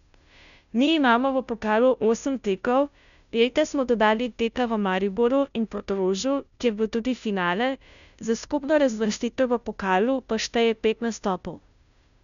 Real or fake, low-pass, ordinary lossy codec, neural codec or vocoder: fake; 7.2 kHz; none; codec, 16 kHz, 0.5 kbps, FunCodec, trained on Chinese and English, 25 frames a second